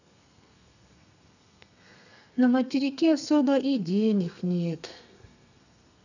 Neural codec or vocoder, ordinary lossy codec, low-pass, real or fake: codec, 32 kHz, 1.9 kbps, SNAC; none; 7.2 kHz; fake